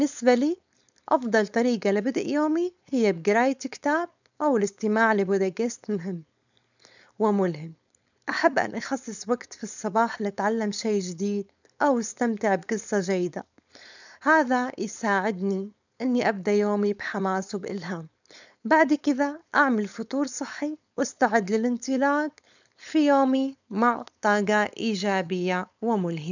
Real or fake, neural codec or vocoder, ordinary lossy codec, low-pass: fake; codec, 16 kHz, 4.8 kbps, FACodec; none; 7.2 kHz